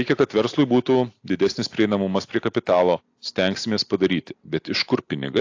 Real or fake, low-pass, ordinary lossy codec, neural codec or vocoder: real; 7.2 kHz; AAC, 48 kbps; none